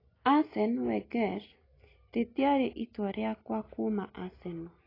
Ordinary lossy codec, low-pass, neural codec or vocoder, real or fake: AAC, 24 kbps; 5.4 kHz; none; real